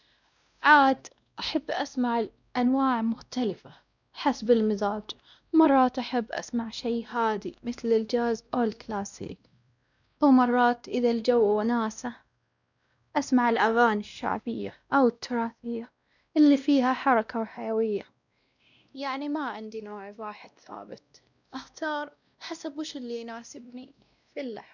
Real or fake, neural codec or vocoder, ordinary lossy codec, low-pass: fake; codec, 16 kHz, 1 kbps, X-Codec, WavLM features, trained on Multilingual LibriSpeech; none; 7.2 kHz